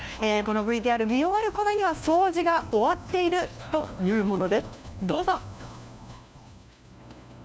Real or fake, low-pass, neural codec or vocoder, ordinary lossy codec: fake; none; codec, 16 kHz, 1 kbps, FunCodec, trained on LibriTTS, 50 frames a second; none